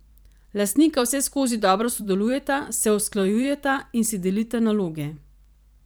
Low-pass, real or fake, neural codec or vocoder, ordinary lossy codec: none; fake; vocoder, 44.1 kHz, 128 mel bands every 512 samples, BigVGAN v2; none